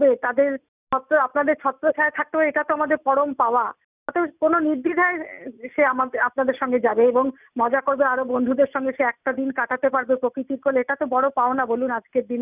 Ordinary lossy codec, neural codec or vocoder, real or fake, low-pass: none; none; real; 3.6 kHz